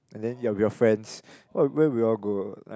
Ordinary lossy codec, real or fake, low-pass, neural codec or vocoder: none; real; none; none